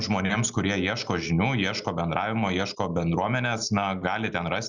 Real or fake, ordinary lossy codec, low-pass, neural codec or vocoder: real; Opus, 64 kbps; 7.2 kHz; none